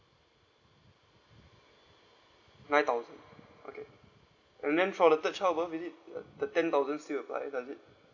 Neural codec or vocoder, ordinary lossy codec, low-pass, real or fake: none; none; 7.2 kHz; real